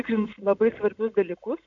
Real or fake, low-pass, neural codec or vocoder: real; 7.2 kHz; none